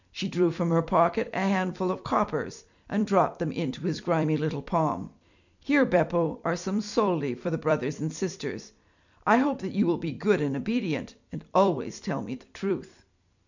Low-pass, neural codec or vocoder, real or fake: 7.2 kHz; vocoder, 44.1 kHz, 128 mel bands every 256 samples, BigVGAN v2; fake